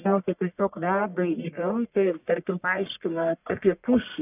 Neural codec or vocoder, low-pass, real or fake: codec, 44.1 kHz, 1.7 kbps, Pupu-Codec; 3.6 kHz; fake